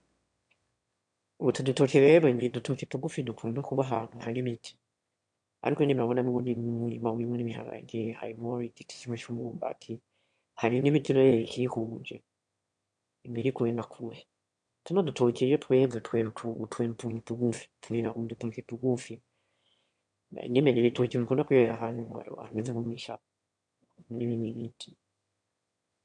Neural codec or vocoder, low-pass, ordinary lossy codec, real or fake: autoencoder, 22.05 kHz, a latent of 192 numbers a frame, VITS, trained on one speaker; 9.9 kHz; MP3, 64 kbps; fake